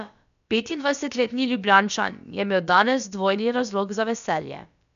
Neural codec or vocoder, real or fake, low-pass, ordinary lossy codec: codec, 16 kHz, about 1 kbps, DyCAST, with the encoder's durations; fake; 7.2 kHz; none